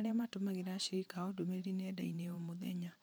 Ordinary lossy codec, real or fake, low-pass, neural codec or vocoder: none; fake; none; vocoder, 44.1 kHz, 128 mel bands every 512 samples, BigVGAN v2